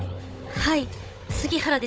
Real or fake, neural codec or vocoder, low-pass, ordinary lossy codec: fake; codec, 16 kHz, 16 kbps, FunCodec, trained on Chinese and English, 50 frames a second; none; none